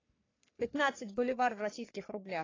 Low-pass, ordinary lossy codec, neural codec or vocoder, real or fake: 7.2 kHz; AAC, 32 kbps; codec, 44.1 kHz, 3.4 kbps, Pupu-Codec; fake